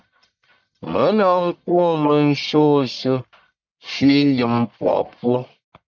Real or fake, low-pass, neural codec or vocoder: fake; 7.2 kHz; codec, 44.1 kHz, 1.7 kbps, Pupu-Codec